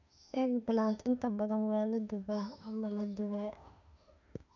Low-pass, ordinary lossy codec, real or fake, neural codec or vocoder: 7.2 kHz; none; fake; autoencoder, 48 kHz, 32 numbers a frame, DAC-VAE, trained on Japanese speech